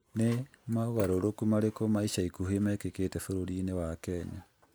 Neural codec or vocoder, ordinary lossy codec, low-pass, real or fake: none; none; none; real